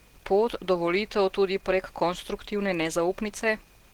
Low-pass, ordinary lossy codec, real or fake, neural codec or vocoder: 19.8 kHz; Opus, 16 kbps; real; none